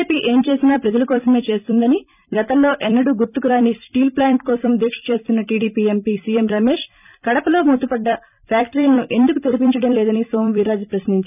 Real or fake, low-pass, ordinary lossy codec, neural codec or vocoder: fake; 3.6 kHz; none; vocoder, 44.1 kHz, 128 mel bands every 256 samples, BigVGAN v2